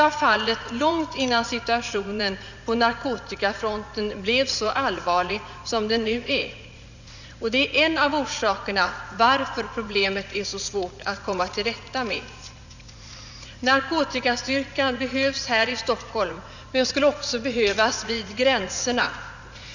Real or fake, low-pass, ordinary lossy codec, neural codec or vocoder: fake; 7.2 kHz; none; vocoder, 44.1 kHz, 80 mel bands, Vocos